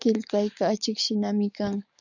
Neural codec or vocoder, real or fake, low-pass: codec, 44.1 kHz, 7.8 kbps, DAC; fake; 7.2 kHz